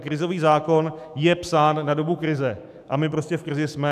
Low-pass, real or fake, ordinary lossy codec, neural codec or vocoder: 14.4 kHz; fake; AAC, 96 kbps; autoencoder, 48 kHz, 128 numbers a frame, DAC-VAE, trained on Japanese speech